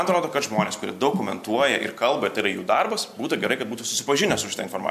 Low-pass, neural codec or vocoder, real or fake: 14.4 kHz; none; real